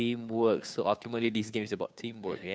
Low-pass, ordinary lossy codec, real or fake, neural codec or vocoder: none; none; fake; codec, 16 kHz, 2 kbps, FunCodec, trained on Chinese and English, 25 frames a second